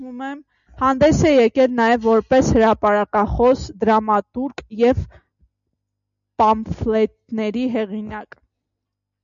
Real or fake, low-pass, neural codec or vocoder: real; 7.2 kHz; none